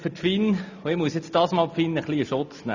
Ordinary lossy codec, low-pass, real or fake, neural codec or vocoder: none; 7.2 kHz; real; none